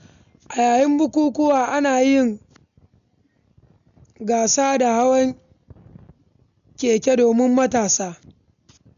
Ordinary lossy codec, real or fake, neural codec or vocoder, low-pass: none; real; none; 7.2 kHz